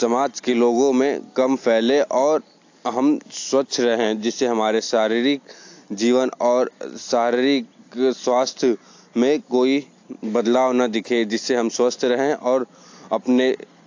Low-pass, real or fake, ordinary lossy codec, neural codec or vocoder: 7.2 kHz; real; AAC, 48 kbps; none